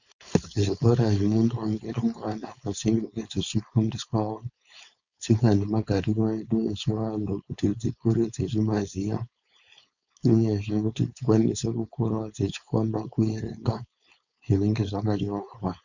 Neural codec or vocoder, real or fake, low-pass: codec, 16 kHz, 4.8 kbps, FACodec; fake; 7.2 kHz